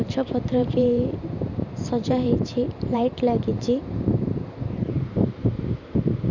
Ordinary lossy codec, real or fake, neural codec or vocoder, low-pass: none; fake; vocoder, 44.1 kHz, 128 mel bands every 512 samples, BigVGAN v2; 7.2 kHz